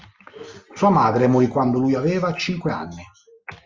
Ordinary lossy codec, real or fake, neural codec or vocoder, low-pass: Opus, 32 kbps; real; none; 7.2 kHz